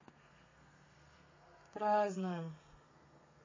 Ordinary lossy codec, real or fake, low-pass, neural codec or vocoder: MP3, 32 kbps; fake; 7.2 kHz; codec, 32 kHz, 1.9 kbps, SNAC